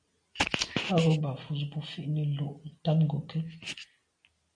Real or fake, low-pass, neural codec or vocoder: real; 9.9 kHz; none